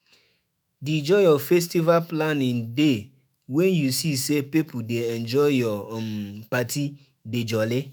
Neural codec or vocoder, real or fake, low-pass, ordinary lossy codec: autoencoder, 48 kHz, 128 numbers a frame, DAC-VAE, trained on Japanese speech; fake; none; none